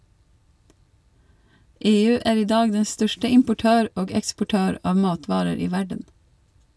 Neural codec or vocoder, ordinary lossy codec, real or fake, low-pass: none; none; real; none